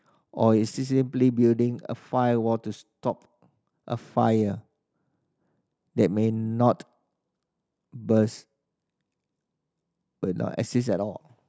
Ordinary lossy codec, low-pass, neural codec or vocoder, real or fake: none; none; none; real